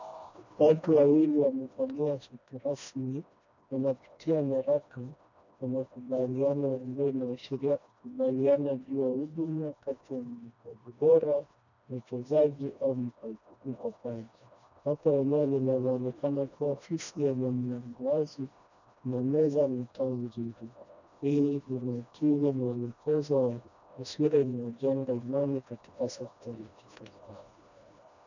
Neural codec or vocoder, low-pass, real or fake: codec, 16 kHz, 1 kbps, FreqCodec, smaller model; 7.2 kHz; fake